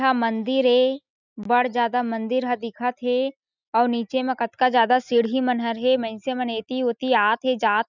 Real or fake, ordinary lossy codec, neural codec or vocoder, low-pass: real; none; none; 7.2 kHz